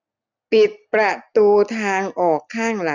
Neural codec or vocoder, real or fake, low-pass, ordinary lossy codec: none; real; 7.2 kHz; none